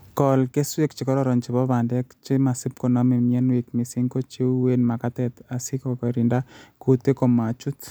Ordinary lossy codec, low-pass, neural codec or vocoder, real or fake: none; none; none; real